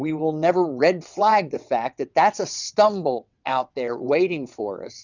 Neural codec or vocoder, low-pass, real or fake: vocoder, 22.05 kHz, 80 mel bands, WaveNeXt; 7.2 kHz; fake